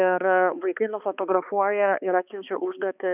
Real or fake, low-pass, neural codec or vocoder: fake; 3.6 kHz; codec, 16 kHz, 2 kbps, X-Codec, HuBERT features, trained on balanced general audio